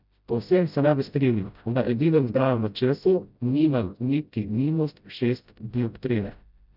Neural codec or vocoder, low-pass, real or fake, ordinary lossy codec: codec, 16 kHz, 0.5 kbps, FreqCodec, smaller model; 5.4 kHz; fake; none